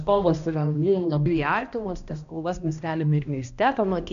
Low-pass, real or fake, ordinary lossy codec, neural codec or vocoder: 7.2 kHz; fake; MP3, 64 kbps; codec, 16 kHz, 1 kbps, X-Codec, HuBERT features, trained on balanced general audio